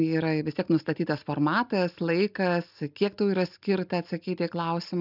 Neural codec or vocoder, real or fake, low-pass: none; real; 5.4 kHz